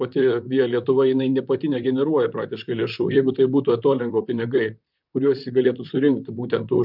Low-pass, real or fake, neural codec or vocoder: 5.4 kHz; fake; vocoder, 44.1 kHz, 128 mel bands, Pupu-Vocoder